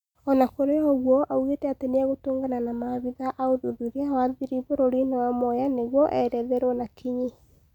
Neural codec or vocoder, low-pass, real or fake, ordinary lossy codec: none; 19.8 kHz; real; none